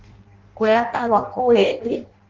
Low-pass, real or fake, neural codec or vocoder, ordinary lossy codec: 7.2 kHz; fake; codec, 16 kHz in and 24 kHz out, 0.6 kbps, FireRedTTS-2 codec; Opus, 16 kbps